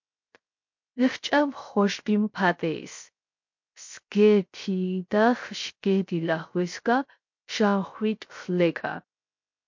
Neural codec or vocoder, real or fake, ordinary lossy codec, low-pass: codec, 16 kHz, 0.3 kbps, FocalCodec; fake; MP3, 48 kbps; 7.2 kHz